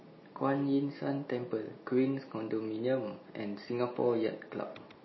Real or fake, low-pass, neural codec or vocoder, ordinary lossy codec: real; 7.2 kHz; none; MP3, 24 kbps